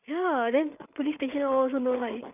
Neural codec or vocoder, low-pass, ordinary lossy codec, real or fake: codec, 16 kHz, 8 kbps, FreqCodec, larger model; 3.6 kHz; none; fake